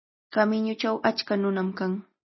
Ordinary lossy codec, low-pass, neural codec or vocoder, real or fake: MP3, 24 kbps; 7.2 kHz; none; real